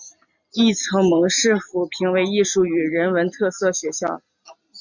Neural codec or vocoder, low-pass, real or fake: none; 7.2 kHz; real